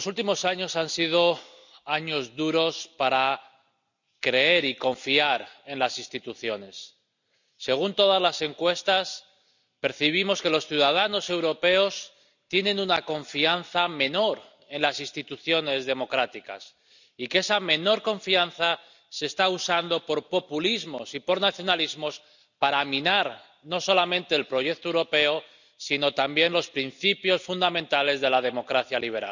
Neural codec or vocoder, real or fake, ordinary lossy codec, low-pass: none; real; none; 7.2 kHz